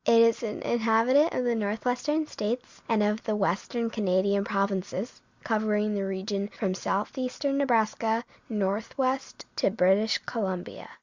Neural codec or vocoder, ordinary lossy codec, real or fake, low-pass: none; Opus, 64 kbps; real; 7.2 kHz